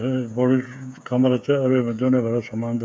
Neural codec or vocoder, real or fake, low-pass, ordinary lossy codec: codec, 16 kHz, 8 kbps, FreqCodec, smaller model; fake; none; none